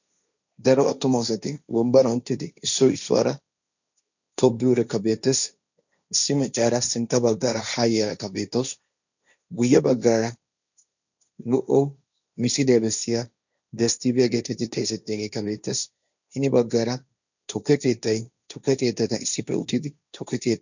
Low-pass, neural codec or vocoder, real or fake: 7.2 kHz; codec, 16 kHz, 1.1 kbps, Voila-Tokenizer; fake